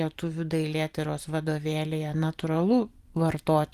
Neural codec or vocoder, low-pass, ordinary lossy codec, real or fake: vocoder, 44.1 kHz, 128 mel bands every 512 samples, BigVGAN v2; 14.4 kHz; Opus, 32 kbps; fake